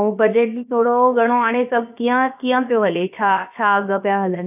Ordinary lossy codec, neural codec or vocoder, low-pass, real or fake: none; codec, 16 kHz, about 1 kbps, DyCAST, with the encoder's durations; 3.6 kHz; fake